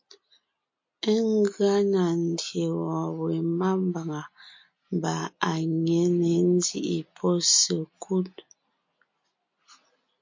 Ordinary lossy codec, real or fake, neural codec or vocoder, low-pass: MP3, 48 kbps; real; none; 7.2 kHz